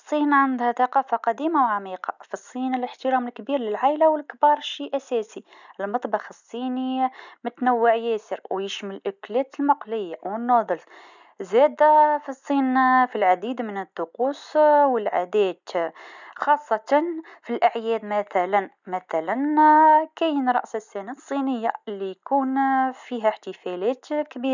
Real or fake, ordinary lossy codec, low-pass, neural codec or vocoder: real; none; 7.2 kHz; none